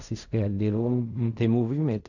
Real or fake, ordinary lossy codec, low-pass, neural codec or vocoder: fake; none; 7.2 kHz; codec, 16 kHz in and 24 kHz out, 0.4 kbps, LongCat-Audio-Codec, fine tuned four codebook decoder